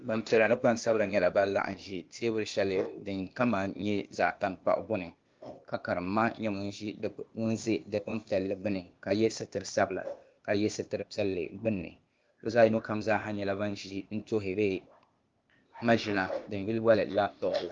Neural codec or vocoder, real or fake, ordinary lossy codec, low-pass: codec, 16 kHz, 0.8 kbps, ZipCodec; fake; Opus, 32 kbps; 7.2 kHz